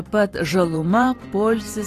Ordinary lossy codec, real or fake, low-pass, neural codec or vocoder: AAC, 48 kbps; fake; 14.4 kHz; vocoder, 44.1 kHz, 128 mel bands every 512 samples, BigVGAN v2